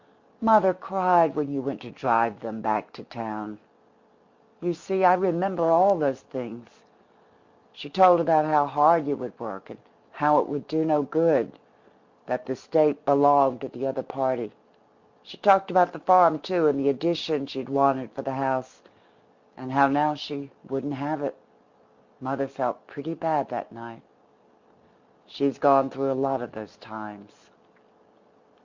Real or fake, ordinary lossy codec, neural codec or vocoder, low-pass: real; MP3, 64 kbps; none; 7.2 kHz